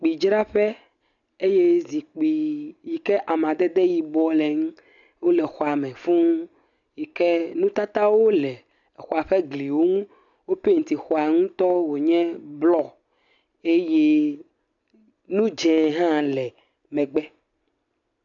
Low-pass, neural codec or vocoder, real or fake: 7.2 kHz; none; real